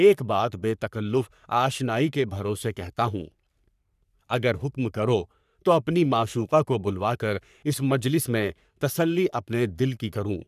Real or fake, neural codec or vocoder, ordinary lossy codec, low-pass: fake; codec, 44.1 kHz, 3.4 kbps, Pupu-Codec; none; 14.4 kHz